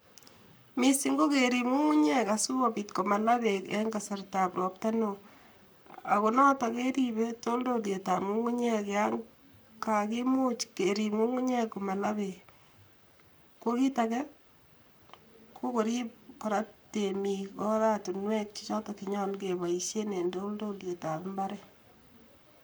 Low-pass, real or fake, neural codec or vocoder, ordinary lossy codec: none; fake; codec, 44.1 kHz, 7.8 kbps, Pupu-Codec; none